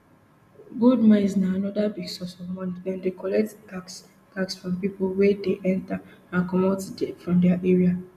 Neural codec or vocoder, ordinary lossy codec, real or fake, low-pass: none; none; real; 14.4 kHz